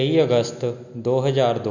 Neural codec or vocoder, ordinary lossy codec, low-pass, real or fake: none; none; 7.2 kHz; real